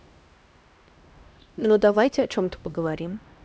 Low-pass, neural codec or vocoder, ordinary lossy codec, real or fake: none; codec, 16 kHz, 1 kbps, X-Codec, HuBERT features, trained on LibriSpeech; none; fake